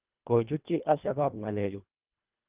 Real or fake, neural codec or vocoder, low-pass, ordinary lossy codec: fake; codec, 24 kHz, 1.5 kbps, HILCodec; 3.6 kHz; Opus, 24 kbps